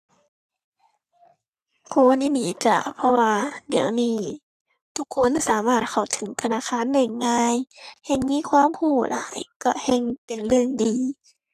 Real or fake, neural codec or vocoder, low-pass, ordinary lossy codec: fake; codec, 44.1 kHz, 3.4 kbps, Pupu-Codec; 14.4 kHz; none